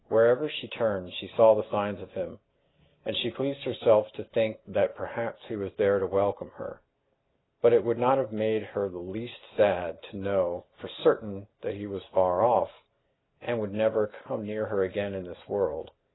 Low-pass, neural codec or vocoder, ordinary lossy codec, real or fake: 7.2 kHz; none; AAC, 16 kbps; real